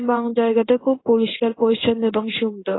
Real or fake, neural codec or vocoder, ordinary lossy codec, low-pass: fake; codec, 16 kHz in and 24 kHz out, 1 kbps, XY-Tokenizer; AAC, 16 kbps; 7.2 kHz